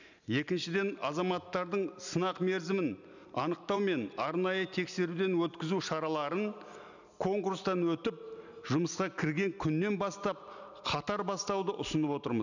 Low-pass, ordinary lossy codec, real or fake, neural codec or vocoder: 7.2 kHz; none; real; none